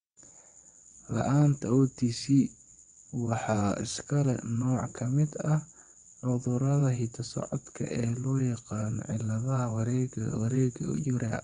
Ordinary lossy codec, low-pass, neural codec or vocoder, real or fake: Opus, 64 kbps; 9.9 kHz; vocoder, 22.05 kHz, 80 mel bands, WaveNeXt; fake